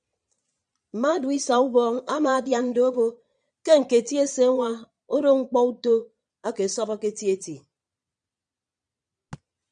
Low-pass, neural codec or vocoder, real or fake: 9.9 kHz; vocoder, 22.05 kHz, 80 mel bands, Vocos; fake